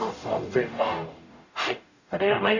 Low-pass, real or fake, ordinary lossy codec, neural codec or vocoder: 7.2 kHz; fake; none; codec, 44.1 kHz, 0.9 kbps, DAC